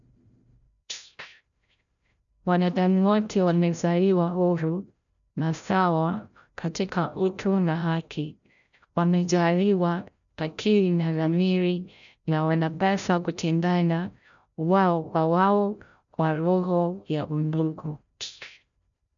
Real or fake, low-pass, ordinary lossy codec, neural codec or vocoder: fake; 7.2 kHz; none; codec, 16 kHz, 0.5 kbps, FreqCodec, larger model